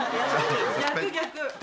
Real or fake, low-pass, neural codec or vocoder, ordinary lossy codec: real; none; none; none